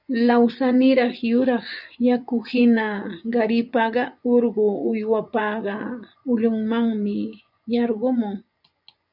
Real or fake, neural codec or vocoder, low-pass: fake; vocoder, 24 kHz, 100 mel bands, Vocos; 5.4 kHz